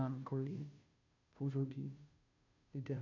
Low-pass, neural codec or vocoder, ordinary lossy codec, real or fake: 7.2 kHz; codec, 16 kHz, 0.5 kbps, FunCodec, trained on Chinese and English, 25 frames a second; none; fake